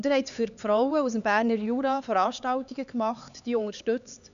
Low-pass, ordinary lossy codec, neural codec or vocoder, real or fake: 7.2 kHz; none; codec, 16 kHz, 4 kbps, X-Codec, HuBERT features, trained on LibriSpeech; fake